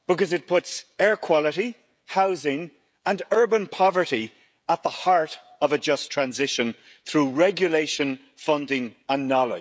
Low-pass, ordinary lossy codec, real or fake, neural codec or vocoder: none; none; fake; codec, 16 kHz, 16 kbps, FreqCodec, smaller model